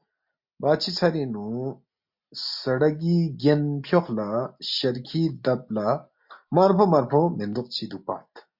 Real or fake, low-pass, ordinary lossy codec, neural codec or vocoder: real; 5.4 kHz; MP3, 48 kbps; none